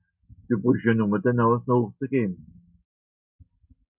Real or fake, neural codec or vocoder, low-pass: real; none; 3.6 kHz